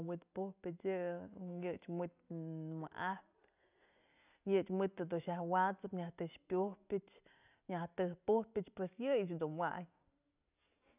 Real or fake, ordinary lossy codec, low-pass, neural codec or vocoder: real; none; 3.6 kHz; none